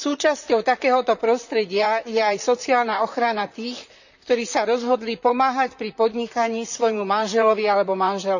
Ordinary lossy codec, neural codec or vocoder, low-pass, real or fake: none; vocoder, 44.1 kHz, 128 mel bands, Pupu-Vocoder; 7.2 kHz; fake